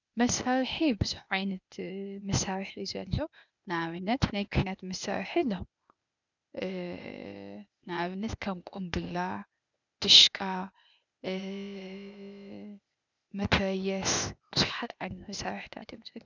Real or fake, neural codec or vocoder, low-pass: fake; codec, 16 kHz, 0.8 kbps, ZipCodec; 7.2 kHz